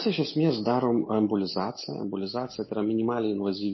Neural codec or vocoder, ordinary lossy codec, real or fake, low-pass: none; MP3, 24 kbps; real; 7.2 kHz